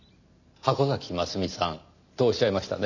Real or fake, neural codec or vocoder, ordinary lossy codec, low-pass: real; none; none; 7.2 kHz